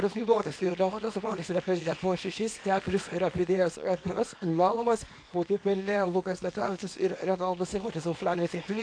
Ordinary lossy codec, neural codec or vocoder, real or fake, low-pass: AAC, 48 kbps; codec, 24 kHz, 0.9 kbps, WavTokenizer, small release; fake; 9.9 kHz